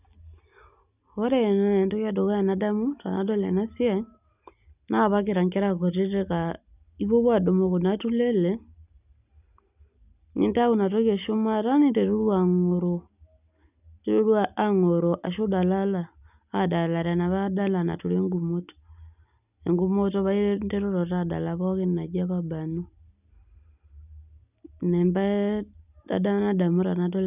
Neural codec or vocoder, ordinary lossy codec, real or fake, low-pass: none; none; real; 3.6 kHz